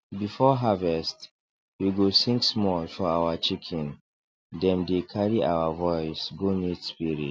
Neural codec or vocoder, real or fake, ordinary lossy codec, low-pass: none; real; none; none